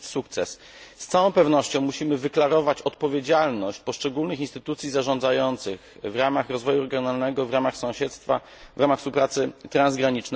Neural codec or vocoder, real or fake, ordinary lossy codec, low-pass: none; real; none; none